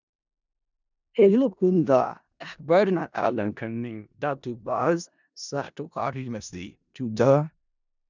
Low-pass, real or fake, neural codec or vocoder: 7.2 kHz; fake; codec, 16 kHz in and 24 kHz out, 0.4 kbps, LongCat-Audio-Codec, four codebook decoder